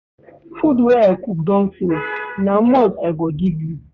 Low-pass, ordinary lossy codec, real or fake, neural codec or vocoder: 7.2 kHz; Opus, 64 kbps; fake; codec, 32 kHz, 1.9 kbps, SNAC